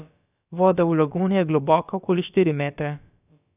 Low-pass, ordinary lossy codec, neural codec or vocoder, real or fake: 3.6 kHz; none; codec, 16 kHz, about 1 kbps, DyCAST, with the encoder's durations; fake